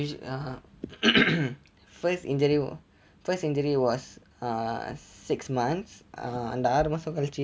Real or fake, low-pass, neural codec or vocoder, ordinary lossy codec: real; none; none; none